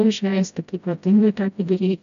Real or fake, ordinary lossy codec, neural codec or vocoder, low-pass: fake; AAC, 96 kbps; codec, 16 kHz, 0.5 kbps, FreqCodec, smaller model; 7.2 kHz